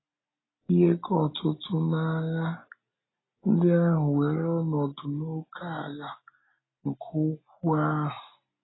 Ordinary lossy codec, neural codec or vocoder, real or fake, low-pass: AAC, 16 kbps; none; real; 7.2 kHz